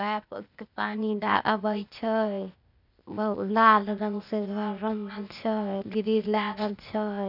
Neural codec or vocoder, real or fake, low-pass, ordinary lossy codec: codec, 16 kHz, 0.8 kbps, ZipCodec; fake; 5.4 kHz; none